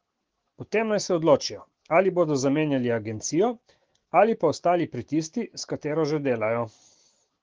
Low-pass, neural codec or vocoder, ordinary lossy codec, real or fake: 7.2 kHz; autoencoder, 48 kHz, 128 numbers a frame, DAC-VAE, trained on Japanese speech; Opus, 16 kbps; fake